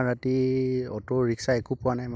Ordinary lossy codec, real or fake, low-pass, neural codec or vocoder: none; real; none; none